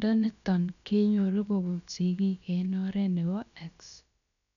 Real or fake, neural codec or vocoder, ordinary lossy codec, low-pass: fake; codec, 16 kHz, about 1 kbps, DyCAST, with the encoder's durations; none; 7.2 kHz